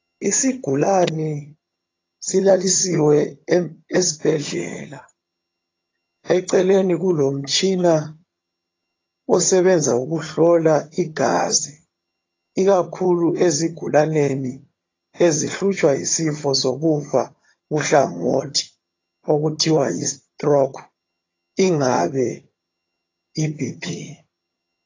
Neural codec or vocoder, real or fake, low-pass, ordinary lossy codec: vocoder, 22.05 kHz, 80 mel bands, HiFi-GAN; fake; 7.2 kHz; AAC, 32 kbps